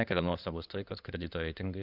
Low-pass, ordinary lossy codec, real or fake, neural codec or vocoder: 5.4 kHz; Opus, 64 kbps; fake; codec, 16 kHz in and 24 kHz out, 2.2 kbps, FireRedTTS-2 codec